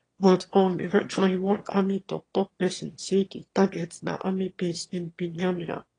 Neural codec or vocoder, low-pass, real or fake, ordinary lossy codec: autoencoder, 22.05 kHz, a latent of 192 numbers a frame, VITS, trained on one speaker; 9.9 kHz; fake; AAC, 32 kbps